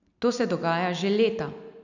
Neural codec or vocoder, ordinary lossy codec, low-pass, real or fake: none; none; 7.2 kHz; real